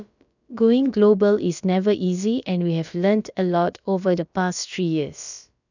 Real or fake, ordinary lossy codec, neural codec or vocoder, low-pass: fake; none; codec, 16 kHz, about 1 kbps, DyCAST, with the encoder's durations; 7.2 kHz